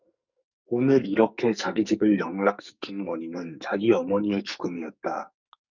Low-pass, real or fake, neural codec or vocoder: 7.2 kHz; fake; codec, 44.1 kHz, 2.6 kbps, SNAC